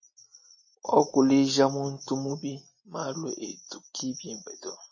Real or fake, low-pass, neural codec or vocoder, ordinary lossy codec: real; 7.2 kHz; none; MP3, 32 kbps